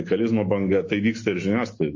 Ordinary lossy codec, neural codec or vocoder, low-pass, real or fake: MP3, 32 kbps; autoencoder, 48 kHz, 128 numbers a frame, DAC-VAE, trained on Japanese speech; 7.2 kHz; fake